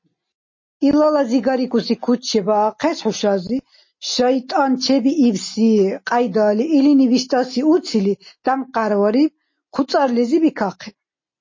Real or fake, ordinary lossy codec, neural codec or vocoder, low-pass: real; MP3, 32 kbps; none; 7.2 kHz